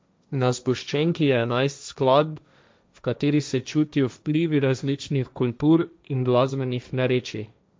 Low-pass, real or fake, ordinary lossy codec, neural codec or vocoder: none; fake; none; codec, 16 kHz, 1.1 kbps, Voila-Tokenizer